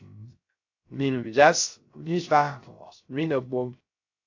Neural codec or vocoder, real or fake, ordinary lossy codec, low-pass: codec, 16 kHz, 0.3 kbps, FocalCodec; fake; AAC, 48 kbps; 7.2 kHz